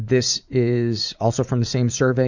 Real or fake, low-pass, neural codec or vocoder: fake; 7.2 kHz; vocoder, 44.1 kHz, 80 mel bands, Vocos